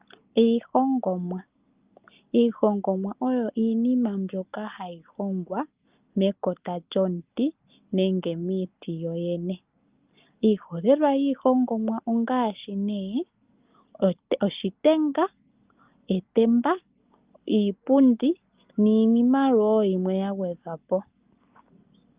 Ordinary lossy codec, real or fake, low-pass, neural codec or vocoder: Opus, 24 kbps; real; 3.6 kHz; none